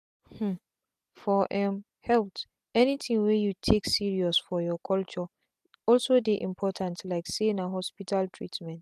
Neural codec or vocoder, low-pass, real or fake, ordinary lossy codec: none; 14.4 kHz; real; AAC, 96 kbps